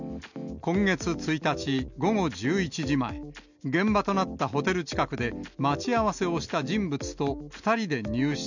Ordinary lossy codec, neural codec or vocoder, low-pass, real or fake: none; none; 7.2 kHz; real